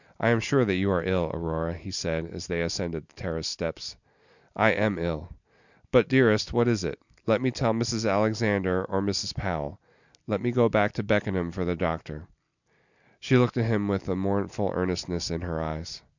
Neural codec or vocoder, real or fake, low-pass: none; real; 7.2 kHz